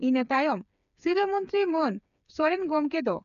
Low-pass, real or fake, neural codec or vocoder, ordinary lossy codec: 7.2 kHz; fake; codec, 16 kHz, 4 kbps, FreqCodec, smaller model; none